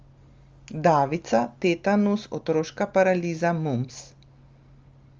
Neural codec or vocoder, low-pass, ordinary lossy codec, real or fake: none; 7.2 kHz; Opus, 32 kbps; real